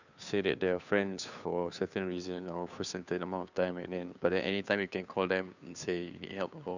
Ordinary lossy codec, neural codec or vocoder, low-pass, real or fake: none; codec, 16 kHz, 2 kbps, FunCodec, trained on Chinese and English, 25 frames a second; 7.2 kHz; fake